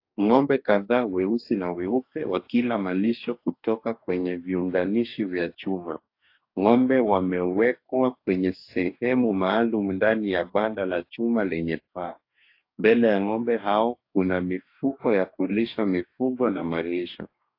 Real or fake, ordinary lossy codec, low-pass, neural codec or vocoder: fake; AAC, 32 kbps; 5.4 kHz; codec, 44.1 kHz, 2.6 kbps, DAC